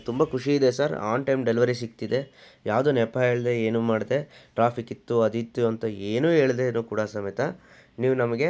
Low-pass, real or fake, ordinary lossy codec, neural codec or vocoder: none; real; none; none